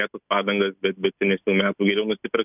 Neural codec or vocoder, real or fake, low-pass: none; real; 3.6 kHz